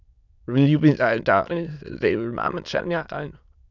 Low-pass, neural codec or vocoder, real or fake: 7.2 kHz; autoencoder, 22.05 kHz, a latent of 192 numbers a frame, VITS, trained on many speakers; fake